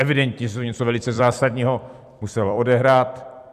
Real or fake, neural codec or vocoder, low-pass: fake; vocoder, 44.1 kHz, 128 mel bands every 256 samples, BigVGAN v2; 14.4 kHz